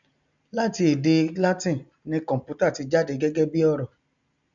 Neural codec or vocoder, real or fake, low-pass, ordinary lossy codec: none; real; 7.2 kHz; none